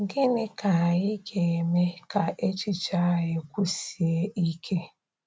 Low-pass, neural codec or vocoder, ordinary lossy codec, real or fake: none; none; none; real